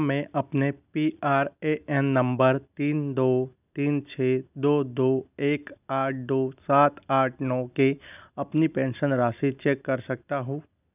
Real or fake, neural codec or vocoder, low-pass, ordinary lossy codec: real; none; 3.6 kHz; none